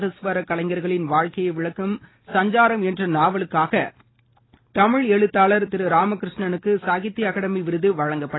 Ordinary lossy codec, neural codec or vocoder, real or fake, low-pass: AAC, 16 kbps; none; real; 7.2 kHz